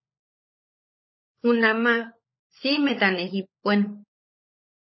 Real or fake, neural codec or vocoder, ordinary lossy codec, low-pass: fake; codec, 16 kHz, 16 kbps, FunCodec, trained on LibriTTS, 50 frames a second; MP3, 24 kbps; 7.2 kHz